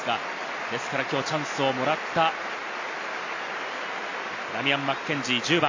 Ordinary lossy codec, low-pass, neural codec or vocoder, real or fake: AAC, 48 kbps; 7.2 kHz; none; real